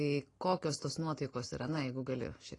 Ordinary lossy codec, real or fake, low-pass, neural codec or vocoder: AAC, 32 kbps; real; 10.8 kHz; none